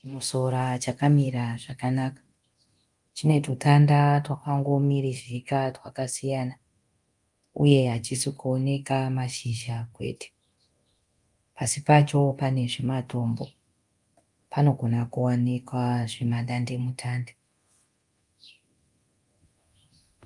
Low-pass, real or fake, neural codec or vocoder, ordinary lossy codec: 10.8 kHz; fake; codec, 24 kHz, 0.9 kbps, DualCodec; Opus, 32 kbps